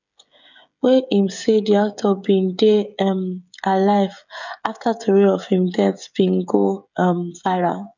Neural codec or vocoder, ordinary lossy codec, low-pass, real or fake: codec, 16 kHz, 16 kbps, FreqCodec, smaller model; none; 7.2 kHz; fake